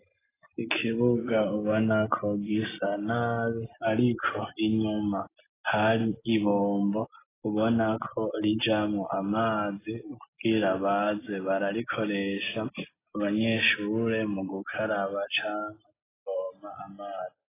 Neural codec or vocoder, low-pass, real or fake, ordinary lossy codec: none; 3.6 kHz; real; AAC, 16 kbps